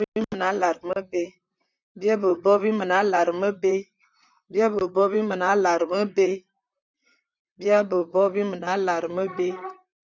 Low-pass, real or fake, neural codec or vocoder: 7.2 kHz; fake; vocoder, 44.1 kHz, 128 mel bands, Pupu-Vocoder